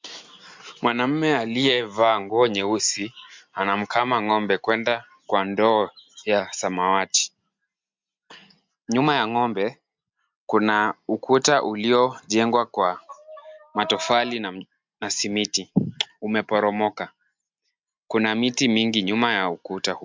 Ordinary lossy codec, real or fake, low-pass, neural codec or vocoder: MP3, 64 kbps; real; 7.2 kHz; none